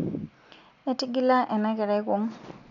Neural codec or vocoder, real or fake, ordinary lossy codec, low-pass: none; real; none; 7.2 kHz